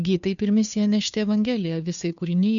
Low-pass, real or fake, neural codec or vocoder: 7.2 kHz; fake; codec, 16 kHz, 2 kbps, FunCodec, trained on Chinese and English, 25 frames a second